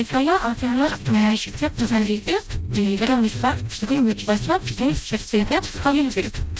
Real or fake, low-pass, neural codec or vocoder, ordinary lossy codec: fake; none; codec, 16 kHz, 0.5 kbps, FreqCodec, smaller model; none